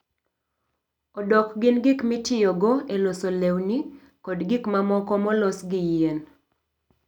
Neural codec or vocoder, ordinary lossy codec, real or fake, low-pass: none; none; real; 19.8 kHz